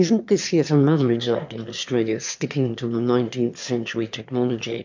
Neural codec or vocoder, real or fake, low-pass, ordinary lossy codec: autoencoder, 22.05 kHz, a latent of 192 numbers a frame, VITS, trained on one speaker; fake; 7.2 kHz; MP3, 64 kbps